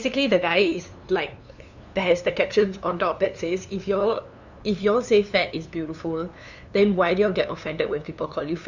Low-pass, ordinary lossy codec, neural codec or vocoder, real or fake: 7.2 kHz; none; codec, 16 kHz, 2 kbps, FunCodec, trained on LibriTTS, 25 frames a second; fake